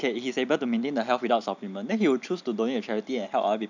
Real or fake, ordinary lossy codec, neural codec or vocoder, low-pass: real; none; none; 7.2 kHz